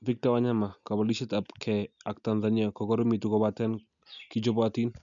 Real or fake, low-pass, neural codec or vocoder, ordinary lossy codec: real; 7.2 kHz; none; none